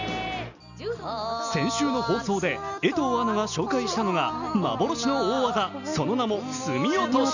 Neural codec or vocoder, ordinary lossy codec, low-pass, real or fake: none; none; 7.2 kHz; real